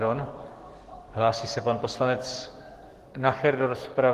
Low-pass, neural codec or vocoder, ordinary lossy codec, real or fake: 14.4 kHz; codec, 44.1 kHz, 7.8 kbps, DAC; Opus, 16 kbps; fake